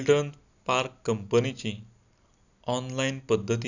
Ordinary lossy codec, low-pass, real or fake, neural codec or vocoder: none; 7.2 kHz; real; none